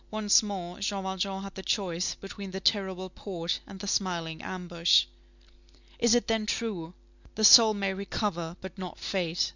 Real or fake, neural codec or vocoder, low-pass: real; none; 7.2 kHz